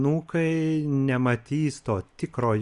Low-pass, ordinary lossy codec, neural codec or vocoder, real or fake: 10.8 kHz; Opus, 64 kbps; none; real